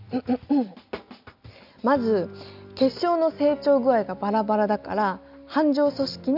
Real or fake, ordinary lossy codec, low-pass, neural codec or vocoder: real; none; 5.4 kHz; none